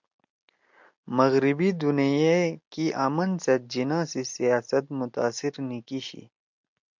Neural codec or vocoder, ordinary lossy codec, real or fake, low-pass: none; MP3, 64 kbps; real; 7.2 kHz